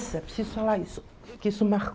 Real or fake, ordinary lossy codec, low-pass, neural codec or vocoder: real; none; none; none